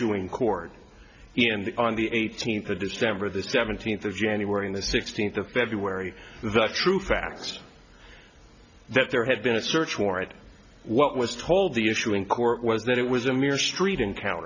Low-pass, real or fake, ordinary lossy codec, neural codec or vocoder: 7.2 kHz; real; Opus, 64 kbps; none